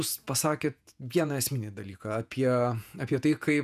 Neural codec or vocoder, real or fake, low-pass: vocoder, 48 kHz, 128 mel bands, Vocos; fake; 14.4 kHz